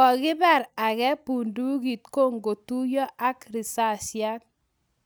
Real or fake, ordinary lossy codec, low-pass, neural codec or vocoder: real; none; none; none